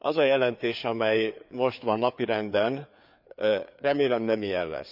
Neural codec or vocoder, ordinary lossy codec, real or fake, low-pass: codec, 16 kHz, 4 kbps, FreqCodec, larger model; none; fake; 5.4 kHz